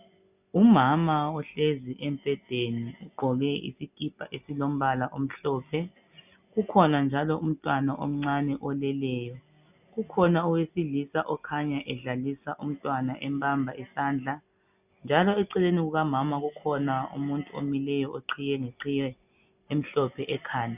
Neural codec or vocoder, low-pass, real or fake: none; 3.6 kHz; real